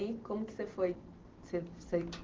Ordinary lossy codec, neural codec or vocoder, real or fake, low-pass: Opus, 16 kbps; none; real; 7.2 kHz